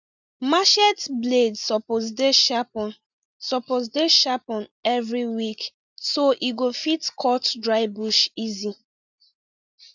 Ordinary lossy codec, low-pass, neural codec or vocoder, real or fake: none; 7.2 kHz; none; real